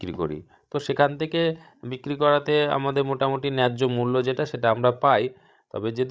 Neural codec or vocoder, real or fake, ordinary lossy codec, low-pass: codec, 16 kHz, 16 kbps, FreqCodec, larger model; fake; none; none